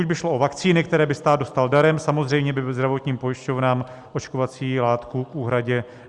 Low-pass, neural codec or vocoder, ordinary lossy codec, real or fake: 10.8 kHz; none; Opus, 64 kbps; real